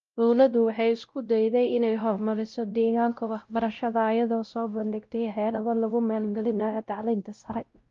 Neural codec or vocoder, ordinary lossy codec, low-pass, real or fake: codec, 16 kHz, 0.5 kbps, X-Codec, WavLM features, trained on Multilingual LibriSpeech; Opus, 32 kbps; 7.2 kHz; fake